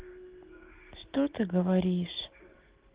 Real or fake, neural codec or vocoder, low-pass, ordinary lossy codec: real; none; 3.6 kHz; Opus, 16 kbps